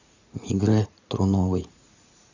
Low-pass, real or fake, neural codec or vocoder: 7.2 kHz; fake; vocoder, 44.1 kHz, 80 mel bands, Vocos